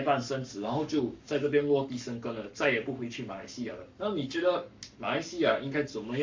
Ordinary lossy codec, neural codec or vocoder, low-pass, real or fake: MP3, 64 kbps; codec, 44.1 kHz, 7.8 kbps, Pupu-Codec; 7.2 kHz; fake